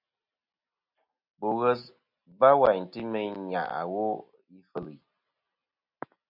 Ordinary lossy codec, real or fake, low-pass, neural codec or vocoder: MP3, 48 kbps; real; 5.4 kHz; none